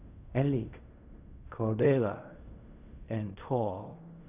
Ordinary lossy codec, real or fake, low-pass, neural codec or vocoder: none; fake; 3.6 kHz; codec, 16 kHz in and 24 kHz out, 0.4 kbps, LongCat-Audio-Codec, fine tuned four codebook decoder